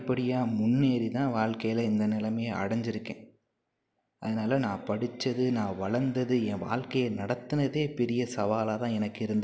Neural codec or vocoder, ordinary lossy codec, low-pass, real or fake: none; none; none; real